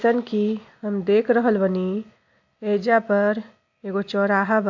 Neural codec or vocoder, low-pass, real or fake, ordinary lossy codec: none; 7.2 kHz; real; AAC, 48 kbps